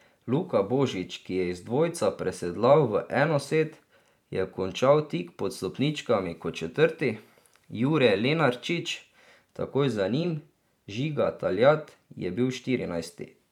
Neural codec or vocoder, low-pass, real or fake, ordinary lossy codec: vocoder, 44.1 kHz, 128 mel bands every 512 samples, BigVGAN v2; 19.8 kHz; fake; none